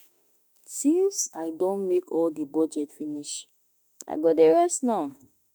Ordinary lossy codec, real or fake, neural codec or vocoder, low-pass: none; fake; autoencoder, 48 kHz, 32 numbers a frame, DAC-VAE, trained on Japanese speech; none